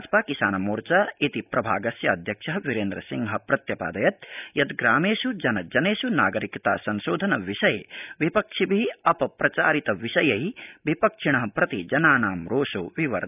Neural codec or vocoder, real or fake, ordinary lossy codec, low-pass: none; real; none; 3.6 kHz